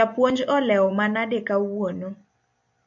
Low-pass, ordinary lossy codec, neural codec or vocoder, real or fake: 7.2 kHz; MP3, 48 kbps; none; real